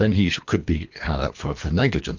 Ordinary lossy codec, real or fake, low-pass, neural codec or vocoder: MP3, 48 kbps; fake; 7.2 kHz; codec, 24 kHz, 3 kbps, HILCodec